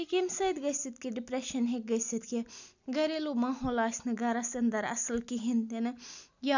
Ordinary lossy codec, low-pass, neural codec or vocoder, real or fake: none; 7.2 kHz; none; real